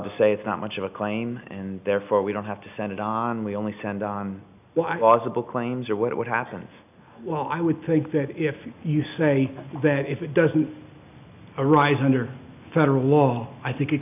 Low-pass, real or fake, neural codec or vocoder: 3.6 kHz; real; none